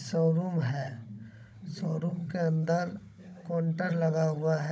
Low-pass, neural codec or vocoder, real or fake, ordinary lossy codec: none; codec, 16 kHz, 16 kbps, FunCodec, trained on Chinese and English, 50 frames a second; fake; none